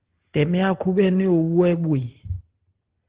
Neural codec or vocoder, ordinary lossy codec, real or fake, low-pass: none; Opus, 16 kbps; real; 3.6 kHz